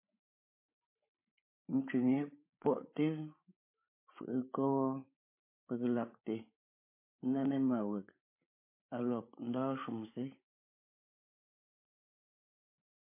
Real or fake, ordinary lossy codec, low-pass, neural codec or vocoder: fake; MP3, 24 kbps; 3.6 kHz; codec, 16 kHz, 8 kbps, FreqCodec, larger model